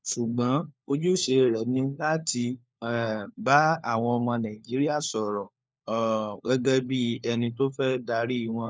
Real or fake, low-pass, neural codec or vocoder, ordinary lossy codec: fake; none; codec, 16 kHz, 4 kbps, FunCodec, trained on LibriTTS, 50 frames a second; none